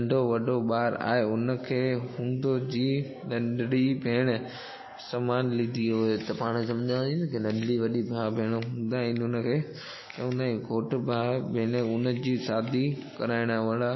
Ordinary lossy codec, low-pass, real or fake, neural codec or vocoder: MP3, 24 kbps; 7.2 kHz; real; none